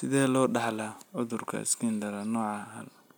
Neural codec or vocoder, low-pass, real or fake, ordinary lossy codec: none; none; real; none